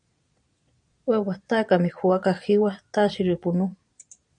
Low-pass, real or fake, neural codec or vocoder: 9.9 kHz; fake; vocoder, 22.05 kHz, 80 mel bands, Vocos